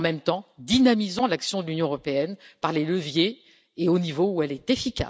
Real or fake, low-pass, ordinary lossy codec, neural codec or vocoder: real; none; none; none